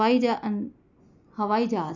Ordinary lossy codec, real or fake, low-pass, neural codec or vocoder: none; real; 7.2 kHz; none